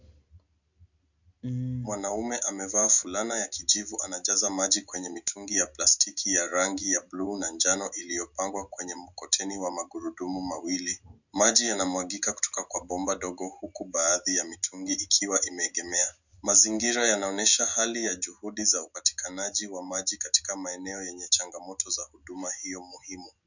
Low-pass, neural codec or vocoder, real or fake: 7.2 kHz; none; real